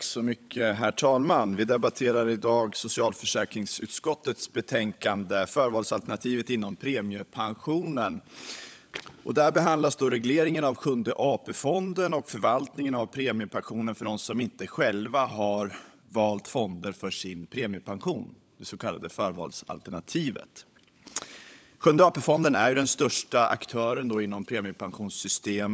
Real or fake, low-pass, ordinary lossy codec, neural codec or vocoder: fake; none; none; codec, 16 kHz, 16 kbps, FunCodec, trained on LibriTTS, 50 frames a second